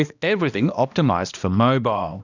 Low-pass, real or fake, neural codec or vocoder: 7.2 kHz; fake; codec, 16 kHz, 1 kbps, X-Codec, HuBERT features, trained on balanced general audio